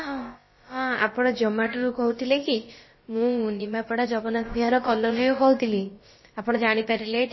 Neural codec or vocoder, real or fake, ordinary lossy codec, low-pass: codec, 16 kHz, about 1 kbps, DyCAST, with the encoder's durations; fake; MP3, 24 kbps; 7.2 kHz